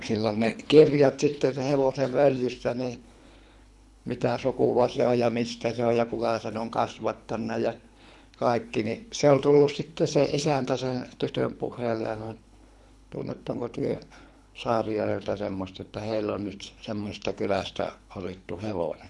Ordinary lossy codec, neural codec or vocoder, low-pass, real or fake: none; codec, 24 kHz, 3 kbps, HILCodec; none; fake